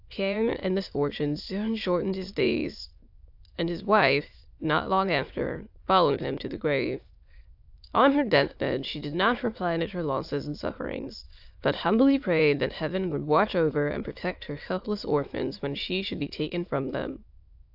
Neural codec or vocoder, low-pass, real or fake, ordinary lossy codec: autoencoder, 22.05 kHz, a latent of 192 numbers a frame, VITS, trained on many speakers; 5.4 kHz; fake; AAC, 48 kbps